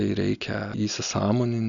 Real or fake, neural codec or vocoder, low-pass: real; none; 7.2 kHz